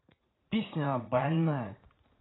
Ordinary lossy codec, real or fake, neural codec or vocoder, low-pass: AAC, 16 kbps; fake; vocoder, 44.1 kHz, 80 mel bands, Vocos; 7.2 kHz